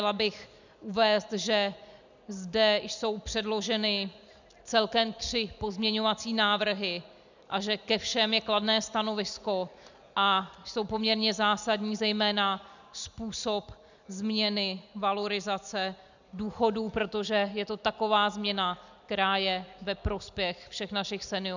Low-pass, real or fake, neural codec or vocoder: 7.2 kHz; real; none